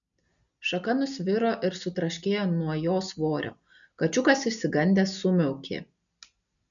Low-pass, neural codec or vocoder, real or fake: 7.2 kHz; none; real